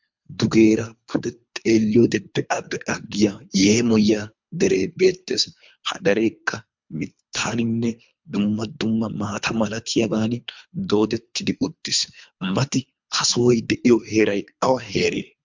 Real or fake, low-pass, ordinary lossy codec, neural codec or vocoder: fake; 7.2 kHz; MP3, 64 kbps; codec, 24 kHz, 3 kbps, HILCodec